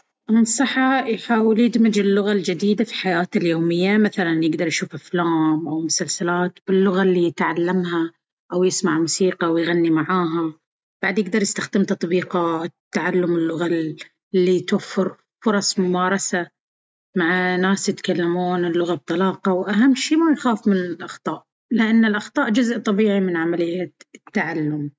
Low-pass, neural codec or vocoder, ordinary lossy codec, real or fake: none; none; none; real